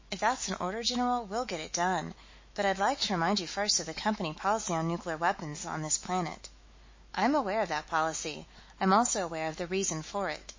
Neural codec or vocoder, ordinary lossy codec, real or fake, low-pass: autoencoder, 48 kHz, 128 numbers a frame, DAC-VAE, trained on Japanese speech; MP3, 32 kbps; fake; 7.2 kHz